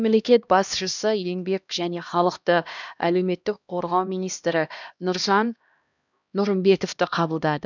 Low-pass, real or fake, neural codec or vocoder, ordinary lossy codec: 7.2 kHz; fake; codec, 16 kHz, 1 kbps, X-Codec, HuBERT features, trained on LibriSpeech; none